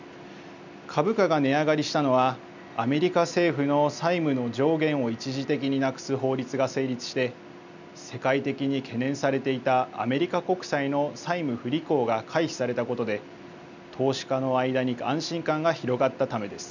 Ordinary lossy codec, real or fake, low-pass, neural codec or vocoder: none; real; 7.2 kHz; none